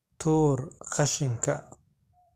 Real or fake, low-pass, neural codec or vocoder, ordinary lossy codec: fake; 14.4 kHz; codec, 44.1 kHz, 7.8 kbps, DAC; Opus, 64 kbps